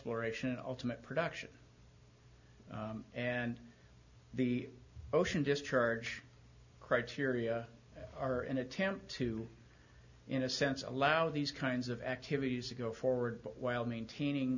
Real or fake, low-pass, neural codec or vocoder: real; 7.2 kHz; none